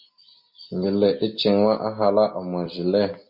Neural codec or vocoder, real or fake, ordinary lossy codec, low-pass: none; real; MP3, 32 kbps; 5.4 kHz